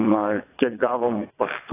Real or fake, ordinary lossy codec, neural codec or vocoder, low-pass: fake; AAC, 16 kbps; vocoder, 22.05 kHz, 80 mel bands, WaveNeXt; 3.6 kHz